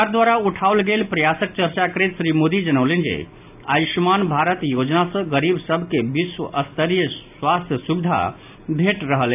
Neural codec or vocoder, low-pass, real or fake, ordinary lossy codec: none; 3.6 kHz; real; AAC, 32 kbps